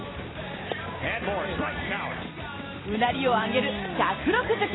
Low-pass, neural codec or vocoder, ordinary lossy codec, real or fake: 7.2 kHz; vocoder, 44.1 kHz, 128 mel bands every 256 samples, BigVGAN v2; AAC, 16 kbps; fake